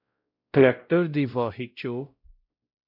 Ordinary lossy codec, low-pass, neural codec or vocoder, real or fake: AAC, 48 kbps; 5.4 kHz; codec, 16 kHz, 0.5 kbps, X-Codec, WavLM features, trained on Multilingual LibriSpeech; fake